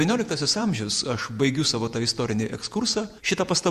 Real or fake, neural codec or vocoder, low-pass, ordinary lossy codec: fake; vocoder, 48 kHz, 128 mel bands, Vocos; 14.4 kHz; Opus, 64 kbps